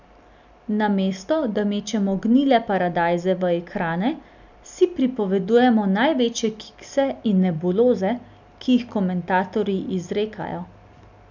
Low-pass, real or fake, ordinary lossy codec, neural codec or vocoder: 7.2 kHz; real; none; none